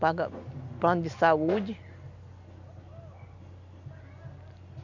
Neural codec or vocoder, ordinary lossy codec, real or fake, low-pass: none; none; real; 7.2 kHz